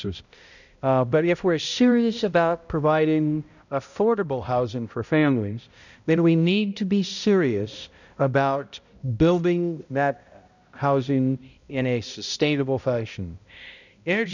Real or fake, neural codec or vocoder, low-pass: fake; codec, 16 kHz, 0.5 kbps, X-Codec, HuBERT features, trained on balanced general audio; 7.2 kHz